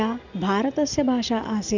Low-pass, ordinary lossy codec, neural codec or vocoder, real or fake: 7.2 kHz; none; none; real